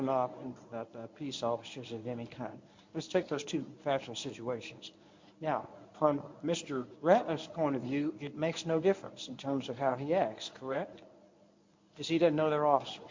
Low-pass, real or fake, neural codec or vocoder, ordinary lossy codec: 7.2 kHz; fake; codec, 24 kHz, 0.9 kbps, WavTokenizer, medium speech release version 1; MP3, 48 kbps